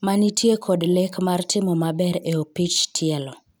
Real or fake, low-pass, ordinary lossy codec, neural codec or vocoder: real; none; none; none